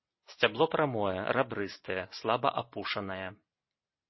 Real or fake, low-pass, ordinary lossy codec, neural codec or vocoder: real; 7.2 kHz; MP3, 24 kbps; none